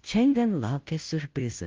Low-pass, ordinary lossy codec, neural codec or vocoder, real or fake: 7.2 kHz; Opus, 32 kbps; codec, 16 kHz, 0.5 kbps, FunCodec, trained on Chinese and English, 25 frames a second; fake